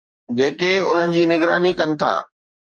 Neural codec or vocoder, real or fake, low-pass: codec, 44.1 kHz, 2.6 kbps, DAC; fake; 9.9 kHz